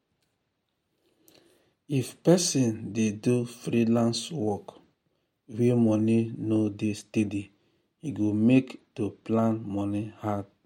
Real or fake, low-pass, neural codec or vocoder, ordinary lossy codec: real; 19.8 kHz; none; MP3, 64 kbps